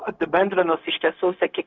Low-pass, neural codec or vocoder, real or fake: 7.2 kHz; codec, 16 kHz, 0.4 kbps, LongCat-Audio-Codec; fake